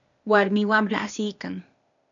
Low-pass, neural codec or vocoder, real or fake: 7.2 kHz; codec, 16 kHz, 0.8 kbps, ZipCodec; fake